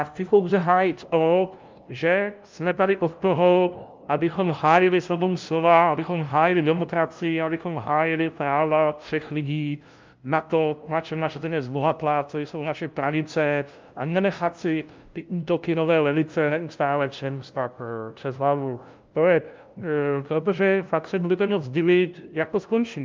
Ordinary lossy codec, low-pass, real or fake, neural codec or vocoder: Opus, 24 kbps; 7.2 kHz; fake; codec, 16 kHz, 0.5 kbps, FunCodec, trained on LibriTTS, 25 frames a second